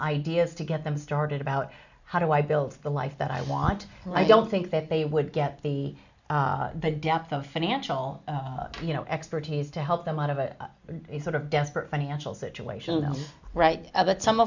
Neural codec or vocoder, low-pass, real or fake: none; 7.2 kHz; real